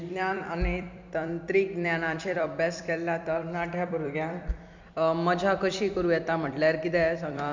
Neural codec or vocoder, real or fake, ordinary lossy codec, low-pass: vocoder, 44.1 kHz, 128 mel bands every 256 samples, BigVGAN v2; fake; MP3, 64 kbps; 7.2 kHz